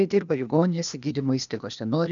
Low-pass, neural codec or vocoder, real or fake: 7.2 kHz; codec, 16 kHz, 0.8 kbps, ZipCodec; fake